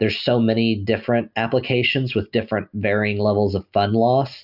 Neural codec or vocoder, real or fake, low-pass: none; real; 5.4 kHz